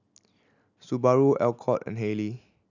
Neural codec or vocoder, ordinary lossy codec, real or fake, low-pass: none; none; real; 7.2 kHz